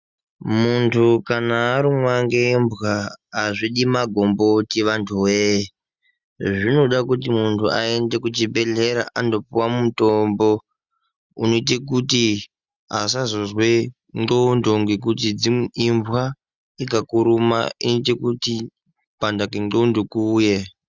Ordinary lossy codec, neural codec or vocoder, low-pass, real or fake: Opus, 64 kbps; none; 7.2 kHz; real